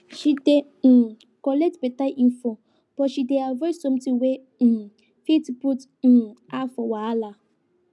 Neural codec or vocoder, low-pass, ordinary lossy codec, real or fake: none; none; none; real